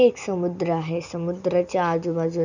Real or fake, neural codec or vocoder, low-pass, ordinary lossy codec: real; none; 7.2 kHz; none